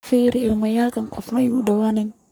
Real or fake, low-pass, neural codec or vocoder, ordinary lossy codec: fake; none; codec, 44.1 kHz, 3.4 kbps, Pupu-Codec; none